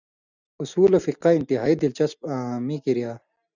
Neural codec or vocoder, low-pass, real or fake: none; 7.2 kHz; real